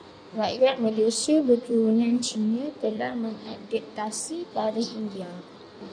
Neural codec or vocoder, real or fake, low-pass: codec, 16 kHz in and 24 kHz out, 1.1 kbps, FireRedTTS-2 codec; fake; 9.9 kHz